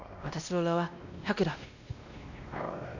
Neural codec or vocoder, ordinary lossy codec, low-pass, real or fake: codec, 16 kHz, 1 kbps, X-Codec, WavLM features, trained on Multilingual LibriSpeech; none; 7.2 kHz; fake